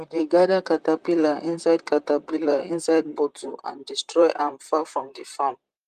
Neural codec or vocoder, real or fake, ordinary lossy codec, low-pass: vocoder, 44.1 kHz, 128 mel bands, Pupu-Vocoder; fake; Opus, 32 kbps; 14.4 kHz